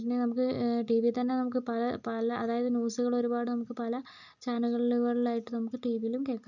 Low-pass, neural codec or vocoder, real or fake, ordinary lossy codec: 7.2 kHz; none; real; none